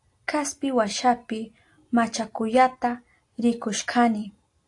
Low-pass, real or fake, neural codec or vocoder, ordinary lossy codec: 10.8 kHz; real; none; AAC, 48 kbps